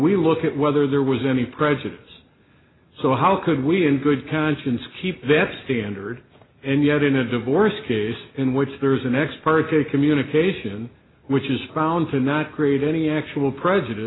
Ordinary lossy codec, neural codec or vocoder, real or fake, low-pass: AAC, 16 kbps; none; real; 7.2 kHz